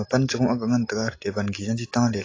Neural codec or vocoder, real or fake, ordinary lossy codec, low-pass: none; real; AAC, 32 kbps; 7.2 kHz